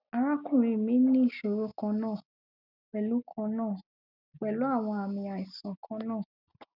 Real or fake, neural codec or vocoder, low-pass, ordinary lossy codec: real; none; 5.4 kHz; none